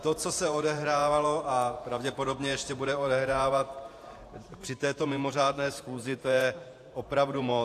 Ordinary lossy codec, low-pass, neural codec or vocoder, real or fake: AAC, 64 kbps; 14.4 kHz; vocoder, 48 kHz, 128 mel bands, Vocos; fake